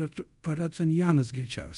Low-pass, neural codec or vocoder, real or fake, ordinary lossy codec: 10.8 kHz; codec, 24 kHz, 0.5 kbps, DualCodec; fake; MP3, 64 kbps